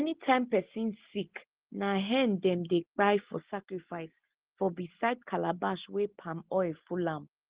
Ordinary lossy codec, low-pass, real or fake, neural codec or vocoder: Opus, 16 kbps; 3.6 kHz; real; none